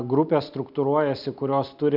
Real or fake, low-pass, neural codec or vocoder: real; 5.4 kHz; none